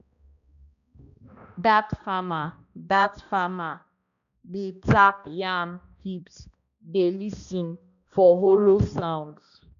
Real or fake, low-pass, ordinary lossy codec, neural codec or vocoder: fake; 7.2 kHz; none; codec, 16 kHz, 1 kbps, X-Codec, HuBERT features, trained on balanced general audio